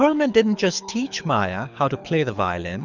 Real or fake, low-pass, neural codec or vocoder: fake; 7.2 kHz; codec, 24 kHz, 6 kbps, HILCodec